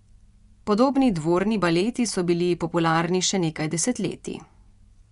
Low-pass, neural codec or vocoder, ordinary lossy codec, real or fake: 10.8 kHz; vocoder, 24 kHz, 100 mel bands, Vocos; none; fake